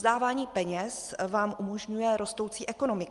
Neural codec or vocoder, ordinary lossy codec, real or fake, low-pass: none; Opus, 32 kbps; real; 10.8 kHz